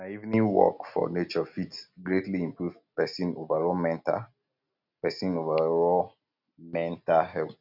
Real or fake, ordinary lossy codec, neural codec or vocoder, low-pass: real; none; none; 5.4 kHz